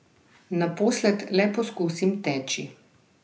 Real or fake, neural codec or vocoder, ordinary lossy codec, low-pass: real; none; none; none